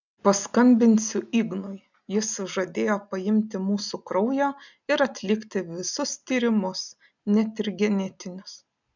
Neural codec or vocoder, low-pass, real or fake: none; 7.2 kHz; real